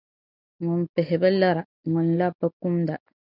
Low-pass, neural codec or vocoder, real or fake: 5.4 kHz; none; real